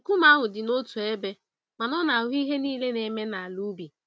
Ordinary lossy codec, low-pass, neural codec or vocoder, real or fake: none; none; none; real